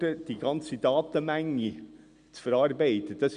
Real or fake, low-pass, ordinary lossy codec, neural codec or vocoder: real; 9.9 kHz; Opus, 64 kbps; none